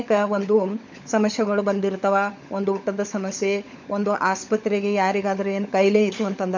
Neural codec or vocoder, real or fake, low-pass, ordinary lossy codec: codec, 16 kHz, 16 kbps, FunCodec, trained on LibriTTS, 50 frames a second; fake; 7.2 kHz; none